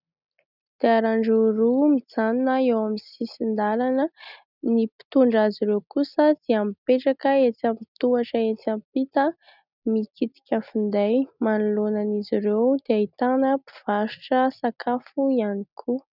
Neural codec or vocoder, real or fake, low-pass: none; real; 5.4 kHz